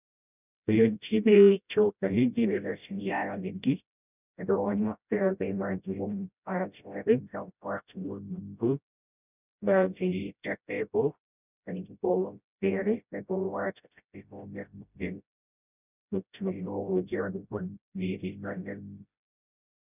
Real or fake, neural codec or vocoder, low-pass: fake; codec, 16 kHz, 0.5 kbps, FreqCodec, smaller model; 3.6 kHz